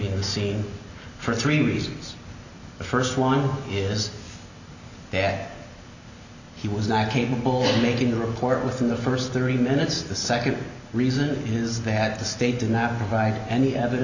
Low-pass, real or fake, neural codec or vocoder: 7.2 kHz; real; none